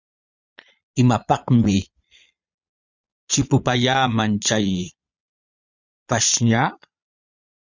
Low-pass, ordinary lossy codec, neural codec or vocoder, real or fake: 7.2 kHz; Opus, 64 kbps; vocoder, 22.05 kHz, 80 mel bands, Vocos; fake